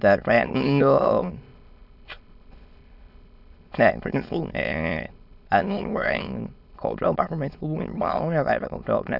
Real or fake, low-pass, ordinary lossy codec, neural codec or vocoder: fake; 5.4 kHz; none; autoencoder, 22.05 kHz, a latent of 192 numbers a frame, VITS, trained on many speakers